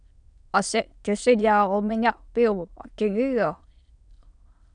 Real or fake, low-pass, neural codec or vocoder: fake; 9.9 kHz; autoencoder, 22.05 kHz, a latent of 192 numbers a frame, VITS, trained on many speakers